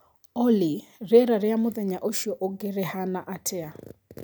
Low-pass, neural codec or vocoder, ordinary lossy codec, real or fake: none; none; none; real